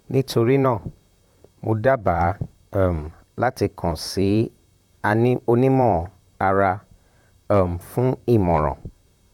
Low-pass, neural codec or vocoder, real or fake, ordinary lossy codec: 19.8 kHz; vocoder, 44.1 kHz, 128 mel bands, Pupu-Vocoder; fake; none